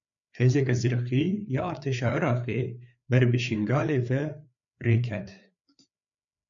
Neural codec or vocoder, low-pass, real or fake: codec, 16 kHz, 4 kbps, FreqCodec, larger model; 7.2 kHz; fake